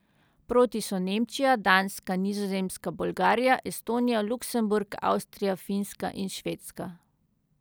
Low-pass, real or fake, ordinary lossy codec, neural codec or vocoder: none; real; none; none